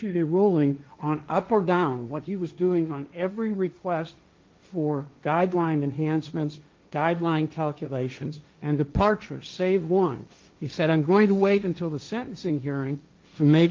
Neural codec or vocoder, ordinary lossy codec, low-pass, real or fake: codec, 16 kHz, 1.1 kbps, Voila-Tokenizer; Opus, 24 kbps; 7.2 kHz; fake